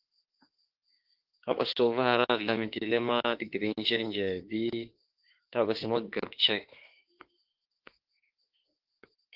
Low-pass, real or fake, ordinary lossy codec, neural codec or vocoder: 5.4 kHz; fake; Opus, 16 kbps; autoencoder, 48 kHz, 32 numbers a frame, DAC-VAE, trained on Japanese speech